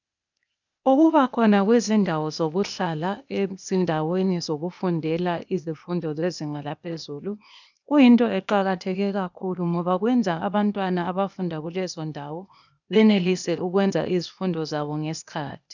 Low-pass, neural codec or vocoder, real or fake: 7.2 kHz; codec, 16 kHz, 0.8 kbps, ZipCodec; fake